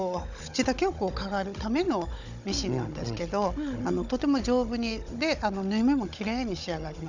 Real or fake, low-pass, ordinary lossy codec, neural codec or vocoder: fake; 7.2 kHz; none; codec, 16 kHz, 16 kbps, FunCodec, trained on Chinese and English, 50 frames a second